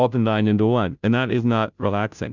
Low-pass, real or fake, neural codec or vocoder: 7.2 kHz; fake; codec, 16 kHz, 0.5 kbps, FunCodec, trained on Chinese and English, 25 frames a second